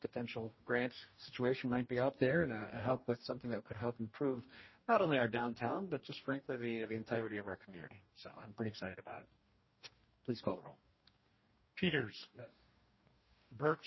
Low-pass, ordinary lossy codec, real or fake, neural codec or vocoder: 7.2 kHz; MP3, 24 kbps; fake; codec, 44.1 kHz, 2.6 kbps, DAC